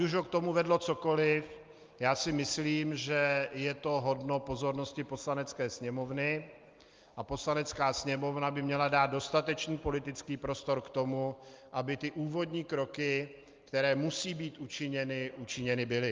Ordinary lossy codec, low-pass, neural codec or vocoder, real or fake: Opus, 32 kbps; 7.2 kHz; none; real